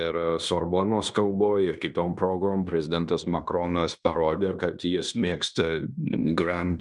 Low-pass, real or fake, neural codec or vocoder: 10.8 kHz; fake; codec, 16 kHz in and 24 kHz out, 0.9 kbps, LongCat-Audio-Codec, fine tuned four codebook decoder